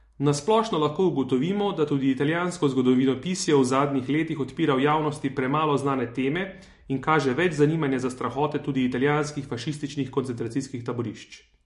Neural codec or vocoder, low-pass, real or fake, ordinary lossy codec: none; 14.4 kHz; real; MP3, 48 kbps